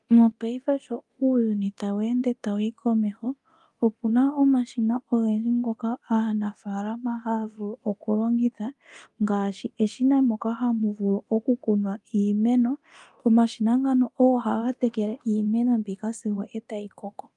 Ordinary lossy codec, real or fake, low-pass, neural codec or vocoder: Opus, 32 kbps; fake; 10.8 kHz; codec, 24 kHz, 0.9 kbps, DualCodec